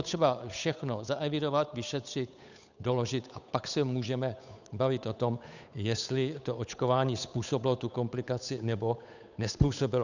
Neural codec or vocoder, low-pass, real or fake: codec, 16 kHz, 8 kbps, FunCodec, trained on Chinese and English, 25 frames a second; 7.2 kHz; fake